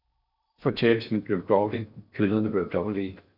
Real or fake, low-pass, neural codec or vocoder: fake; 5.4 kHz; codec, 16 kHz in and 24 kHz out, 0.6 kbps, FocalCodec, streaming, 4096 codes